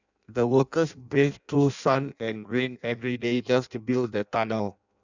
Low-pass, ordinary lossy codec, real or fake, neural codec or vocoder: 7.2 kHz; none; fake; codec, 16 kHz in and 24 kHz out, 0.6 kbps, FireRedTTS-2 codec